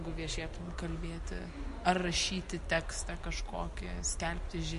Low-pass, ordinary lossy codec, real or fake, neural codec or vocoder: 14.4 kHz; MP3, 48 kbps; real; none